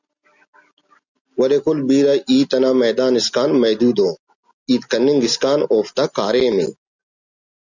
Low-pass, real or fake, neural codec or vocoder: 7.2 kHz; real; none